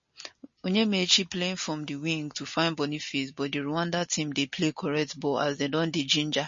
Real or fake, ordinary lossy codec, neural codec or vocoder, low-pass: real; MP3, 32 kbps; none; 7.2 kHz